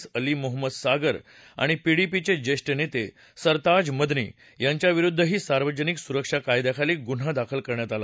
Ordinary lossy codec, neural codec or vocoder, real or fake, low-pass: none; none; real; none